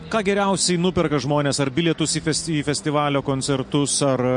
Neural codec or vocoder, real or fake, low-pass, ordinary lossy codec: none; real; 9.9 kHz; MP3, 48 kbps